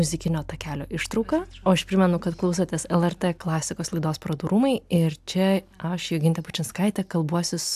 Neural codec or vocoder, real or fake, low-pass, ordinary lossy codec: none; real; 14.4 kHz; AAC, 96 kbps